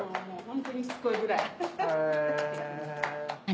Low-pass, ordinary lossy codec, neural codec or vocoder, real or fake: none; none; none; real